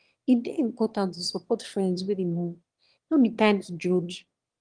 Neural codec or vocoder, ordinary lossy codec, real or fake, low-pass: autoencoder, 22.05 kHz, a latent of 192 numbers a frame, VITS, trained on one speaker; Opus, 24 kbps; fake; 9.9 kHz